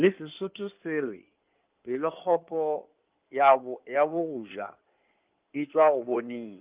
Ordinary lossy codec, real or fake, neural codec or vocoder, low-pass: Opus, 32 kbps; fake; codec, 16 kHz in and 24 kHz out, 2.2 kbps, FireRedTTS-2 codec; 3.6 kHz